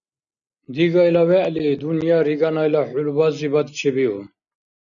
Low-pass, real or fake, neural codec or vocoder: 7.2 kHz; real; none